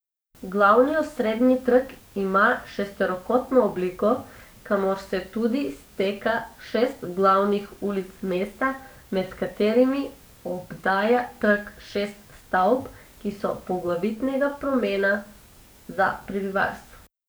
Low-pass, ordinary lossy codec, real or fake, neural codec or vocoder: none; none; fake; codec, 44.1 kHz, 7.8 kbps, DAC